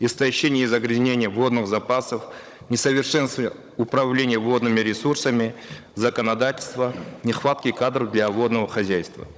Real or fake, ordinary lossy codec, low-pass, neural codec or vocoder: fake; none; none; codec, 16 kHz, 16 kbps, FunCodec, trained on Chinese and English, 50 frames a second